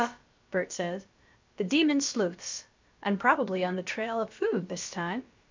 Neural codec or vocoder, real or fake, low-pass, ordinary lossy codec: codec, 16 kHz, about 1 kbps, DyCAST, with the encoder's durations; fake; 7.2 kHz; MP3, 48 kbps